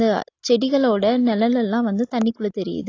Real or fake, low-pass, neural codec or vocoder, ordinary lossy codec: real; 7.2 kHz; none; AAC, 32 kbps